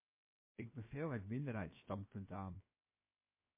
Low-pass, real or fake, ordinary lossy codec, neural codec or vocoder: 3.6 kHz; fake; MP3, 24 kbps; codec, 16 kHz, 0.7 kbps, FocalCodec